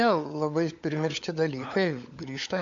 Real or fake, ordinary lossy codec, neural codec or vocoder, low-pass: fake; MP3, 64 kbps; codec, 16 kHz, 16 kbps, FunCodec, trained on LibriTTS, 50 frames a second; 7.2 kHz